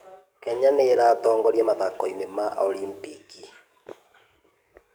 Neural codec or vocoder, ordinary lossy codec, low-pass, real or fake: codec, 44.1 kHz, 7.8 kbps, DAC; none; none; fake